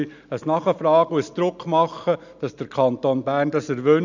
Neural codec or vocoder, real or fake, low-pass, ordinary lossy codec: none; real; 7.2 kHz; none